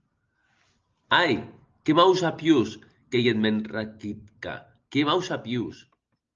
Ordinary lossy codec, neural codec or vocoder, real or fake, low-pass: Opus, 24 kbps; none; real; 7.2 kHz